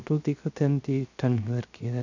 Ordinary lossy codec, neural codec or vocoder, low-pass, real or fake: none; codec, 16 kHz, 0.3 kbps, FocalCodec; 7.2 kHz; fake